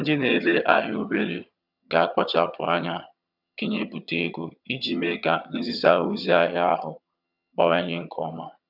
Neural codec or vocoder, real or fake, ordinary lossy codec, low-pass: vocoder, 22.05 kHz, 80 mel bands, HiFi-GAN; fake; none; 5.4 kHz